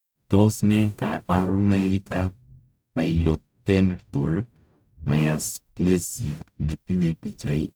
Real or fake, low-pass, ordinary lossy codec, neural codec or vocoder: fake; none; none; codec, 44.1 kHz, 0.9 kbps, DAC